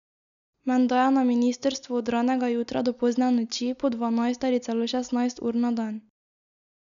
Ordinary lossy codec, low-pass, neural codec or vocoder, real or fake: MP3, 96 kbps; 7.2 kHz; none; real